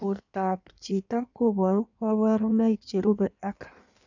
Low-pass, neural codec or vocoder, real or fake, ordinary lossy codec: 7.2 kHz; codec, 16 kHz in and 24 kHz out, 1.1 kbps, FireRedTTS-2 codec; fake; none